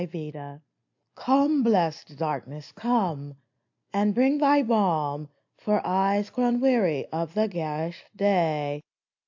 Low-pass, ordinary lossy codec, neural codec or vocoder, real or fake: 7.2 kHz; AAC, 48 kbps; none; real